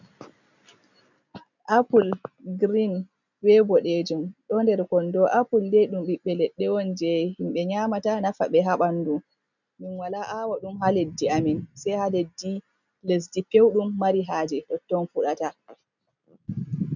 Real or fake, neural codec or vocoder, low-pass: real; none; 7.2 kHz